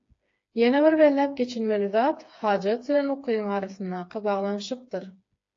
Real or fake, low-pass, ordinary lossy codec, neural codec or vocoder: fake; 7.2 kHz; AAC, 48 kbps; codec, 16 kHz, 4 kbps, FreqCodec, smaller model